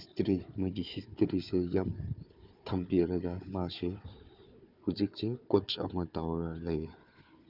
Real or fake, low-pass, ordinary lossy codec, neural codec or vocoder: fake; 5.4 kHz; none; codec, 16 kHz, 4 kbps, FunCodec, trained on Chinese and English, 50 frames a second